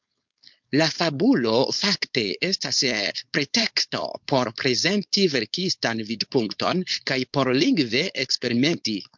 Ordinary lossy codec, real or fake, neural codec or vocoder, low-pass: MP3, 64 kbps; fake; codec, 16 kHz, 4.8 kbps, FACodec; 7.2 kHz